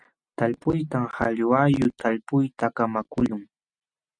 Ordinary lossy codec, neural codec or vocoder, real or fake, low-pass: Opus, 64 kbps; none; real; 9.9 kHz